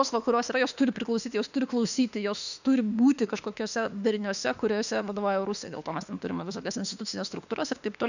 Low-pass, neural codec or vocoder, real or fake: 7.2 kHz; autoencoder, 48 kHz, 32 numbers a frame, DAC-VAE, trained on Japanese speech; fake